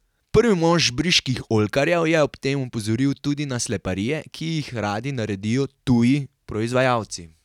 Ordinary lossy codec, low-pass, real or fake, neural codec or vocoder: none; 19.8 kHz; real; none